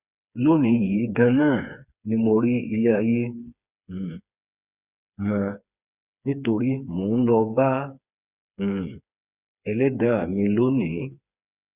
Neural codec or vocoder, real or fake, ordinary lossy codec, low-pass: codec, 16 kHz, 4 kbps, FreqCodec, smaller model; fake; Opus, 64 kbps; 3.6 kHz